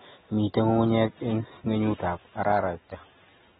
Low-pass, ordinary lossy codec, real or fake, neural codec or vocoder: 14.4 kHz; AAC, 16 kbps; real; none